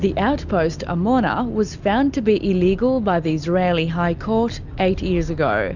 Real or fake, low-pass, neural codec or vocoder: real; 7.2 kHz; none